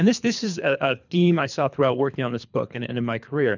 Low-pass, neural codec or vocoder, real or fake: 7.2 kHz; codec, 24 kHz, 3 kbps, HILCodec; fake